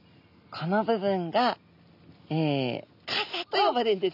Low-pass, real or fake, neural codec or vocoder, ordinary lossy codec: 5.4 kHz; real; none; none